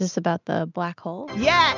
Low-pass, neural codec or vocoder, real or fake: 7.2 kHz; none; real